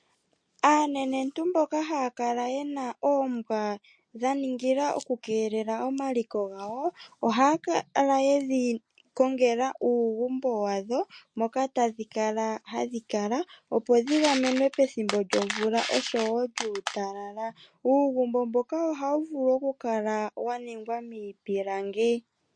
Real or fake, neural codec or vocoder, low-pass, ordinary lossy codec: real; none; 9.9 kHz; MP3, 48 kbps